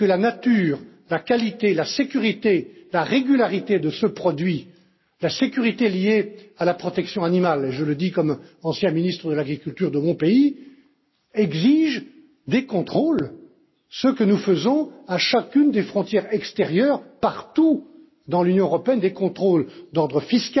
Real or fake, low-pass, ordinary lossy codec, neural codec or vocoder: real; 7.2 kHz; MP3, 24 kbps; none